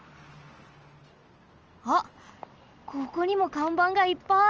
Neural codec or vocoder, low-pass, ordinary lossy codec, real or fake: none; 7.2 kHz; Opus, 24 kbps; real